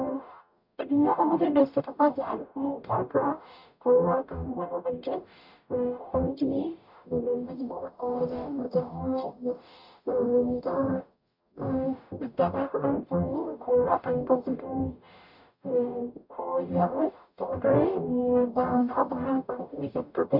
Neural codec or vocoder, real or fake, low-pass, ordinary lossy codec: codec, 44.1 kHz, 0.9 kbps, DAC; fake; 5.4 kHz; none